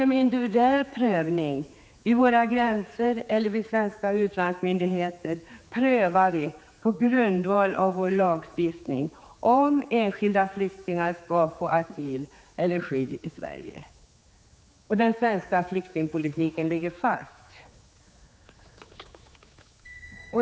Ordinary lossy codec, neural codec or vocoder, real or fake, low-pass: none; codec, 16 kHz, 4 kbps, X-Codec, HuBERT features, trained on general audio; fake; none